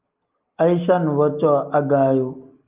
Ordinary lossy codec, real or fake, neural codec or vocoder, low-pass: Opus, 32 kbps; real; none; 3.6 kHz